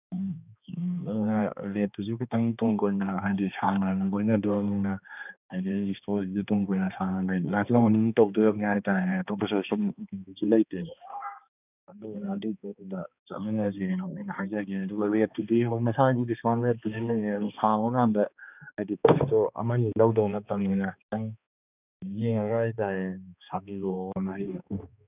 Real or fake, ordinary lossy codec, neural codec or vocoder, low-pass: fake; none; codec, 16 kHz, 2 kbps, X-Codec, HuBERT features, trained on balanced general audio; 3.6 kHz